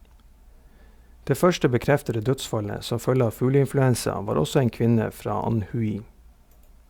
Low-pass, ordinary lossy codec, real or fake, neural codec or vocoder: 19.8 kHz; Opus, 64 kbps; real; none